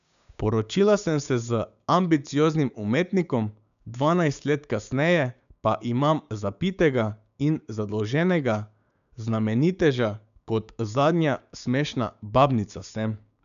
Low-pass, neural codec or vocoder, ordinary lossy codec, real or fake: 7.2 kHz; codec, 16 kHz, 6 kbps, DAC; none; fake